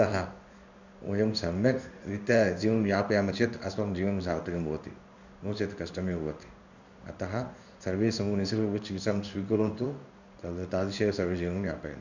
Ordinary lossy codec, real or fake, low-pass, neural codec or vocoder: none; fake; 7.2 kHz; codec, 16 kHz in and 24 kHz out, 1 kbps, XY-Tokenizer